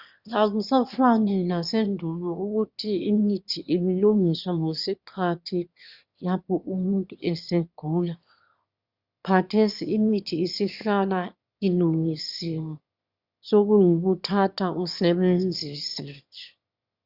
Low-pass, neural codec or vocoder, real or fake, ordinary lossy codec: 5.4 kHz; autoencoder, 22.05 kHz, a latent of 192 numbers a frame, VITS, trained on one speaker; fake; Opus, 64 kbps